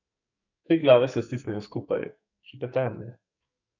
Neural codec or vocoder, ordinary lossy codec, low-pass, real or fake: codec, 44.1 kHz, 2.6 kbps, SNAC; none; 7.2 kHz; fake